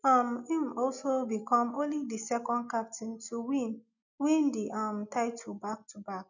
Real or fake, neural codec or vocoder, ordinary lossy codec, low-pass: real; none; none; 7.2 kHz